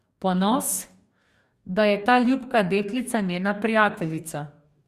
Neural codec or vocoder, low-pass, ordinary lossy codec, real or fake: codec, 44.1 kHz, 2.6 kbps, DAC; 14.4 kHz; Opus, 64 kbps; fake